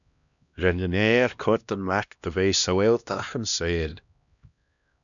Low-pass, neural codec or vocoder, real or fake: 7.2 kHz; codec, 16 kHz, 1 kbps, X-Codec, HuBERT features, trained on LibriSpeech; fake